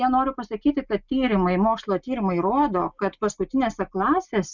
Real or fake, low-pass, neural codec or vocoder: real; 7.2 kHz; none